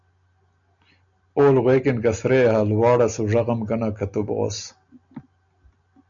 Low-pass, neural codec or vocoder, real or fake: 7.2 kHz; none; real